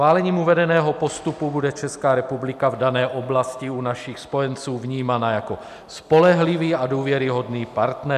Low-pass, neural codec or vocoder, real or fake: 14.4 kHz; none; real